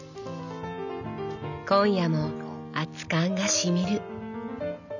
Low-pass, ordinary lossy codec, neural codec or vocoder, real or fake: 7.2 kHz; none; none; real